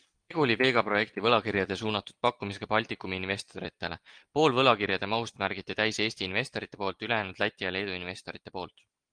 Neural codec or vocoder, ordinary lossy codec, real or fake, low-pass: none; Opus, 24 kbps; real; 9.9 kHz